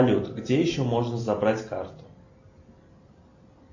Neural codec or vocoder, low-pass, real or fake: none; 7.2 kHz; real